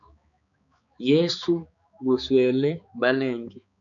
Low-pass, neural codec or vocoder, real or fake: 7.2 kHz; codec, 16 kHz, 4 kbps, X-Codec, HuBERT features, trained on balanced general audio; fake